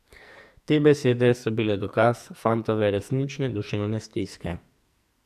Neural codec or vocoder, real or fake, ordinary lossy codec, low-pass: codec, 44.1 kHz, 2.6 kbps, SNAC; fake; none; 14.4 kHz